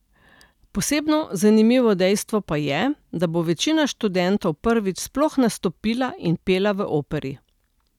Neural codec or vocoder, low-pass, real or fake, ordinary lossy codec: none; 19.8 kHz; real; none